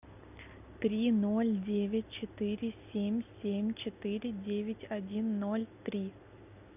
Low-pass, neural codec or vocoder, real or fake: 3.6 kHz; none; real